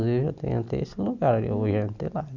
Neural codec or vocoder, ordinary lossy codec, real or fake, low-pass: none; none; real; 7.2 kHz